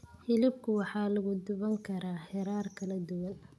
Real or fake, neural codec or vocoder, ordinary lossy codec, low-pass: real; none; none; none